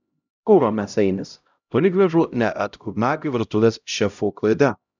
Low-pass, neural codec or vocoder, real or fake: 7.2 kHz; codec, 16 kHz, 0.5 kbps, X-Codec, HuBERT features, trained on LibriSpeech; fake